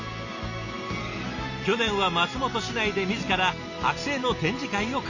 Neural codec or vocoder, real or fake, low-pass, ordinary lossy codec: none; real; 7.2 kHz; none